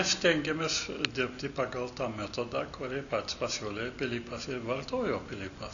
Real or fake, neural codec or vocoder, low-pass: real; none; 7.2 kHz